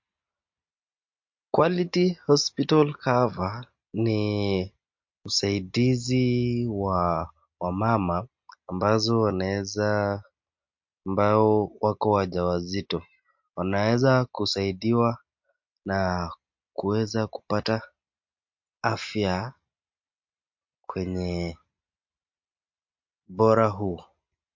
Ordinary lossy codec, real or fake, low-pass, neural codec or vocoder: MP3, 48 kbps; real; 7.2 kHz; none